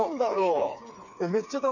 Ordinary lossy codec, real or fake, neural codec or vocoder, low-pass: none; fake; codec, 16 kHz, 4 kbps, FreqCodec, smaller model; 7.2 kHz